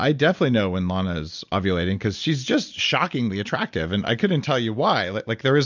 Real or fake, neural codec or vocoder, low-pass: real; none; 7.2 kHz